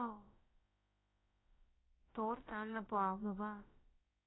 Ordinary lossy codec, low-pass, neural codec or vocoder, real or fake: AAC, 16 kbps; 7.2 kHz; codec, 16 kHz, about 1 kbps, DyCAST, with the encoder's durations; fake